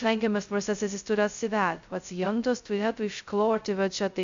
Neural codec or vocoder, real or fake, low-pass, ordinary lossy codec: codec, 16 kHz, 0.2 kbps, FocalCodec; fake; 7.2 kHz; MP3, 64 kbps